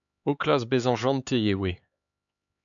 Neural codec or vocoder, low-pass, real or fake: codec, 16 kHz, 4 kbps, X-Codec, HuBERT features, trained on LibriSpeech; 7.2 kHz; fake